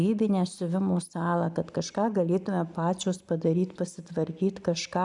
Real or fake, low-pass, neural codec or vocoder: real; 10.8 kHz; none